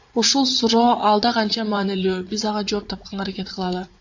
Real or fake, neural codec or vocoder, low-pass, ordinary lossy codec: fake; vocoder, 44.1 kHz, 80 mel bands, Vocos; 7.2 kHz; AAC, 48 kbps